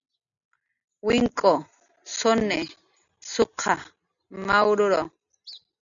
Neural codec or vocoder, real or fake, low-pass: none; real; 7.2 kHz